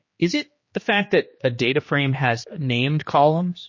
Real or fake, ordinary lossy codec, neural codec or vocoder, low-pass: fake; MP3, 32 kbps; codec, 16 kHz, 2 kbps, X-Codec, HuBERT features, trained on general audio; 7.2 kHz